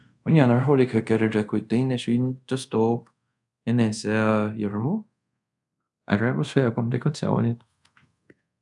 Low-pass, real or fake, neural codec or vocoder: 10.8 kHz; fake; codec, 24 kHz, 0.5 kbps, DualCodec